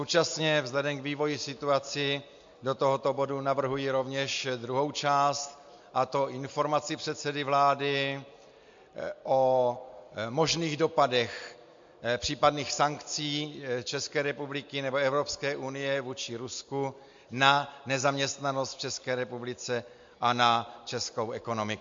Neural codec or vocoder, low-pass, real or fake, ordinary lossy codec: none; 7.2 kHz; real; MP3, 48 kbps